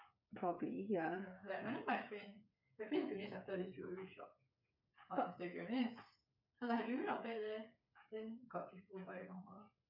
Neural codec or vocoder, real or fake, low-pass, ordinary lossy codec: codec, 16 kHz, 8 kbps, FreqCodec, smaller model; fake; 5.4 kHz; none